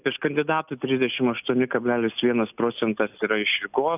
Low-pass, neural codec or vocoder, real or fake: 3.6 kHz; none; real